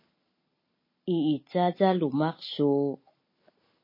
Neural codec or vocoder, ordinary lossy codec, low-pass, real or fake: none; MP3, 24 kbps; 5.4 kHz; real